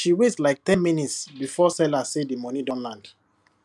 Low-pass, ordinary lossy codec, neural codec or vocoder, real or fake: none; none; none; real